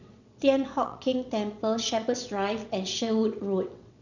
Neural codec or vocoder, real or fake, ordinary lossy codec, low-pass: vocoder, 22.05 kHz, 80 mel bands, WaveNeXt; fake; none; 7.2 kHz